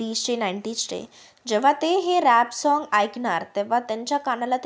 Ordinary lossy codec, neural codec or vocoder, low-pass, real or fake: none; none; none; real